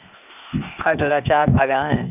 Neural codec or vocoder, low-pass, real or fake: codec, 16 kHz, 0.8 kbps, ZipCodec; 3.6 kHz; fake